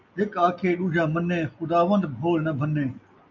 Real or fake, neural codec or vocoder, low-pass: real; none; 7.2 kHz